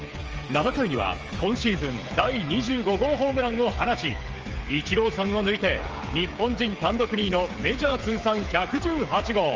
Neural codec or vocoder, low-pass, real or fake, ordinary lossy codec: codec, 16 kHz, 8 kbps, FreqCodec, smaller model; 7.2 kHz; fake; Opus, 24 kbps